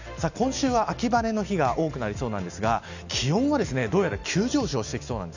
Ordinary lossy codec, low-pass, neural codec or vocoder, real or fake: none; 7.2 kHz; none; real